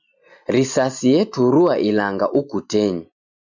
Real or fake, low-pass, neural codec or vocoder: real; 7.2 kHz; none